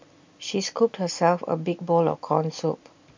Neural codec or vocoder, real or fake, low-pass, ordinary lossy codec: none; real; 7.2 kHz; MP3, 64 kbps